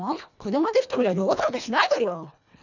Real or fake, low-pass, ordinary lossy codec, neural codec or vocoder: fake; 7.2 kHz; none; codec, 24 kHz, 1.5 kbps, HILCodec